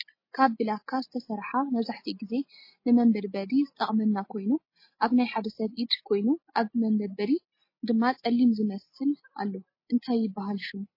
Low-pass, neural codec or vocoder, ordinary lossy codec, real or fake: 5.4 kHz; none; MP3, 24 kbps; real